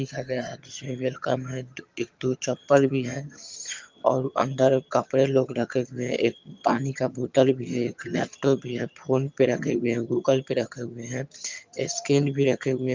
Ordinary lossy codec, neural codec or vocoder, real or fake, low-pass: Opus, 24 kbps; vocoder, 22.05 kHz, 80 mel bands, HiFi-GAN; fake; 7.2 kHz